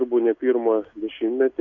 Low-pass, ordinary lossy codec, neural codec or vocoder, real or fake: 7.2 kHz; MP3, 64 kbps; none; real